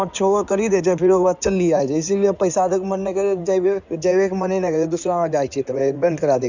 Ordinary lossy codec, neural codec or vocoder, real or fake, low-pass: none; codec, 16 kHz in and 24 kHz out, 2.2 kbps, FireRedTTS-2 codec; fake; 7.2 kHz